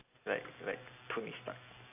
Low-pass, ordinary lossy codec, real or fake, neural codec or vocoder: 3.6 kHz; AAC, 32 kbps; real; none